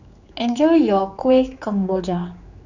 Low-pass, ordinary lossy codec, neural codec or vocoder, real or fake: 7.2 kHz; none; codec, 16 kHz, 4 kbps, X-Codec, HuBERT features, trained on general audio; fake